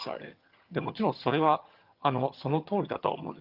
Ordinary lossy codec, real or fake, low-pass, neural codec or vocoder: Opus, 24 kbps; fake; 5.4 kHz; vocoder, 22.05 kHz, 80 mel bands, HiFi-GAN